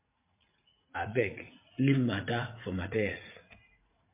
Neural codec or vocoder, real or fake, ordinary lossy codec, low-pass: vocoder, 44.1 kHz, 80 mel bands, Vocos; fake; MP3, 24 kbps; 3.6 kHz